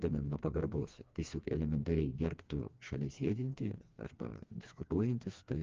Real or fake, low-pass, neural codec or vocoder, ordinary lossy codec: fake; 7.2 kHz; codec, 16 kHz, 2 kbps, FreqCodec, smaller model; Opus, 24 kbps